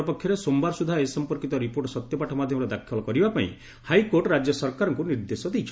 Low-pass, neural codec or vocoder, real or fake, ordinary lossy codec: none; none; real; none